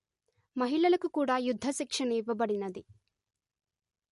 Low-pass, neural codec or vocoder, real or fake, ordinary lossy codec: 14.4 kHz; none; real; MP3, 48 kbps